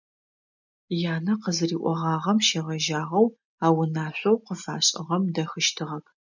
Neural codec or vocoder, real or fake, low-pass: none; real; 7.2 kHz